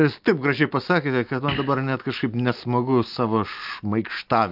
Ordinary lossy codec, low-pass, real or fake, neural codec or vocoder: Opus, 24 kbps; 5.4 kHz; real; none